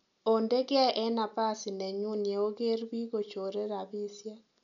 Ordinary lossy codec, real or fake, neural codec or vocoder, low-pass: none; real; none; 7.2 kHz